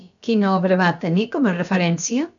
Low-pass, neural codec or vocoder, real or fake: 7.2 kHz; codec, 16 kHz, about 1 kbps, DyCAST, with the encoder's durations; fake